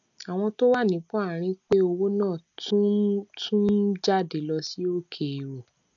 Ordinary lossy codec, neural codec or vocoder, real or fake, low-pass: none; none; real; 7.2 kHz